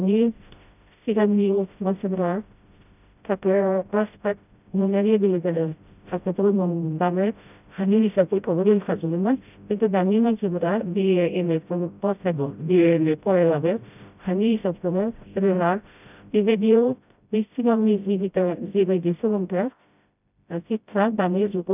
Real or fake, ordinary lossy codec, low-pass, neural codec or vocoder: fake; none; 3.6 kHz; codec, 16 kHz, 0.5 kbps, FreqCodec, smaller model